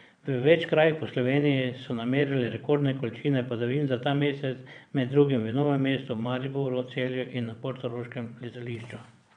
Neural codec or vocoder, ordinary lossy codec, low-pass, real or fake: vocoder, 22.05 kHz, 80 mel bands, WaveNeXt; none; 9.9 kHz; fake